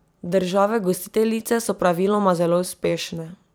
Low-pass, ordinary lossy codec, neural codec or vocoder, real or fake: none; none; vocoder, 44.1 kHz, 128 mel bands every 256 samples, BigVGAN v2; fake